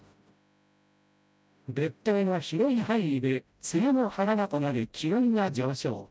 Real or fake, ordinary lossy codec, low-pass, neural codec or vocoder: fake; none; none; codec, 16 kHz, 0.5 kbps, FreqCodec, smaller model